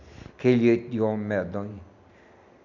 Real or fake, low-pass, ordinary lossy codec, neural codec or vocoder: real; 7.2 kHz; none; none